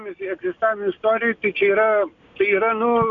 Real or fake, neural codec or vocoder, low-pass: real; none; 7.2 kHz